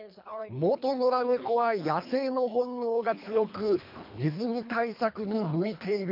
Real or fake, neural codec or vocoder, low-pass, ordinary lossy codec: fake; codec, 24 kHz, 3 kbps, HILCodec; 5.4 kHz; MP3, 48 kbps